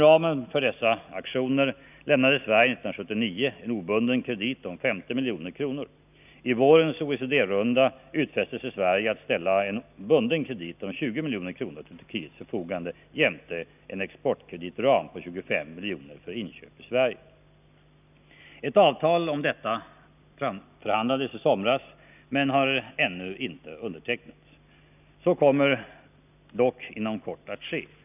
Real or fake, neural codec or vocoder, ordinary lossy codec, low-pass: real; none; none; 3.6 kHz